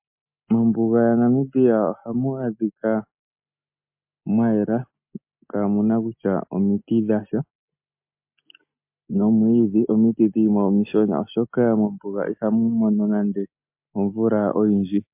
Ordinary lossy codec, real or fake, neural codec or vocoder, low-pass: MP3, 32 kbps; real; none; 3.6 kHz